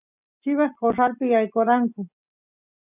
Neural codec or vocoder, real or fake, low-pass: none; real; 3.6 kHz